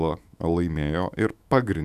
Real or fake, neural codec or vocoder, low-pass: fake; autoencoder, 48 kHz, 128 numbers a frame, DAC-VAE, trained on Japanese speech; 14.4 kHz